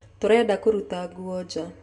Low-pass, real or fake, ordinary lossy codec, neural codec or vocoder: 10.8 kHz; real; none; none